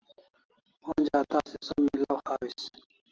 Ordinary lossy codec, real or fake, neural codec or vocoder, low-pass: Opus, 16 kbps; real; none; 7.2 kHz